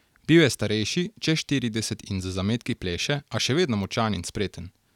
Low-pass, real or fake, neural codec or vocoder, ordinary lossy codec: 19.8 kHz; real; none; none